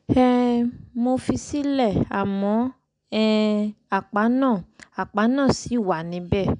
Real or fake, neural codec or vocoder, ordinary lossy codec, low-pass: real; none; none; 9.9 kHz